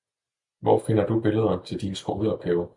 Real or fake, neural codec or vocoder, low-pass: real; none; 10.8 kHz